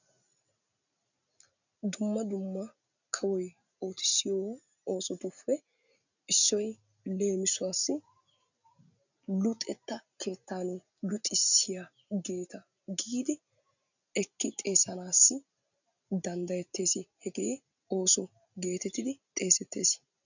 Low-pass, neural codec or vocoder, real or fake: 7.2 kHz; none; real